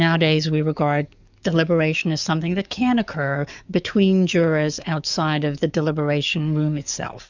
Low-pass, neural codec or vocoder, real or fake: 7.2 kHz; codec, 44.1 kHz, 7.8 kbps, DAC; fake